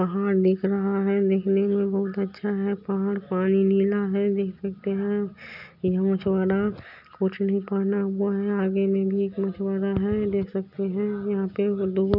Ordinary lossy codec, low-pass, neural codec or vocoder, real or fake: none; 5.4 kHz; none; real